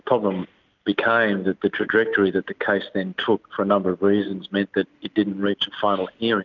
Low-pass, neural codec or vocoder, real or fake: 7.2 kHz; none; real